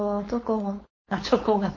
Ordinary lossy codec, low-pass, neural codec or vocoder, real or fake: MP3, 32 kbps; 7.2 kHz; codec, 16 kHz, 4.8 kbps, FACodec; fake